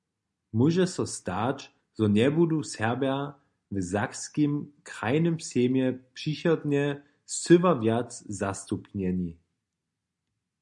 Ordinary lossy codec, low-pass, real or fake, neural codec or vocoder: MP3, 96 kbps; 10.8 kHz; real; none